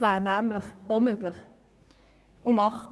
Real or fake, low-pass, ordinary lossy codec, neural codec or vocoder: fake; none; none; codec, 24 kHz, 1 kbps, SNAC